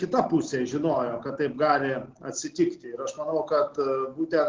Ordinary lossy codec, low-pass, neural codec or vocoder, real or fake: Opus, 16 kbps; 7.2 kHz; none; real